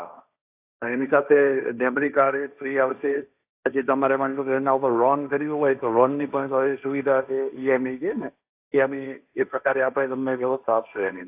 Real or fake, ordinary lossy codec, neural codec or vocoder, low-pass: fake; AAC, 32 kbps; codec, 16 kHz, 1.1 kbps, Voila-Tokenizer; 3.6 kHz